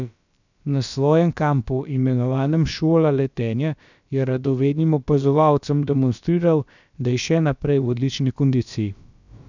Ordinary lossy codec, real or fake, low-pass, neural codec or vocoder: none; fake; 7.2 kHz; codec, 16 kHz, about 1 kbps, DyCAST, with the encoder's durations